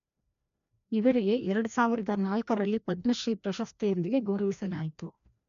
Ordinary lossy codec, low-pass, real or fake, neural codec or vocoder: MP3, 96 kbps; 7.2 kHz; fake; codec, 16 kHz, 1 kbps, FreqCodec, larger model